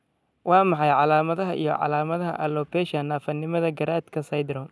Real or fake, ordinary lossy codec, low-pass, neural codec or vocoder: real; none; none; none